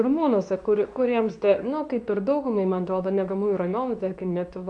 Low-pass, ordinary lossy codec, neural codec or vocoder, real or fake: 10.8 kHz; AAC, 64 kbps; codec, 24 kHz, 0.9 kbps, WavTokenizer, medium speech release version 2; fake